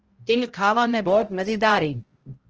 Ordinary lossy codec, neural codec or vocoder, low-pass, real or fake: Opus, 16 kbps; codec, 16 kHz, 0.5 kbps, X-Codec, HuBERT features, trained on balanced general audio; 7.2 kHz; fake